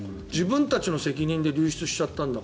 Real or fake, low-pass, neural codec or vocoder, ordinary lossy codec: real; none; none; none